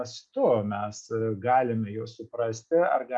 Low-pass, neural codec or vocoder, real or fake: 10.8 kHz; none; real